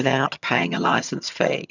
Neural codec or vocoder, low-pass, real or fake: vocoder, 22.05 kHz, 80 mel bands, HiFi-GAN; 7.2 kHz; fake